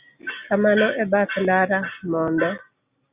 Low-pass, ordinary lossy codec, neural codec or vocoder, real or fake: 3.6 kHz; Opus, 64 kbps; none; real